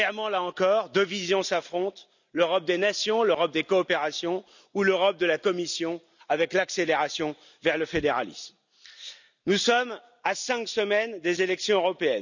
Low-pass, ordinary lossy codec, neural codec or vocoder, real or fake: 7.2 kHz; none; none; real